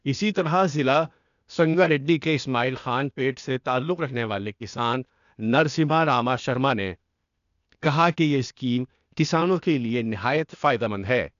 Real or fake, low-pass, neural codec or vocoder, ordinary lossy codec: fake; 7.2 kHz; codec, 16 kHz, 0.8 kbps, ZipCodec; none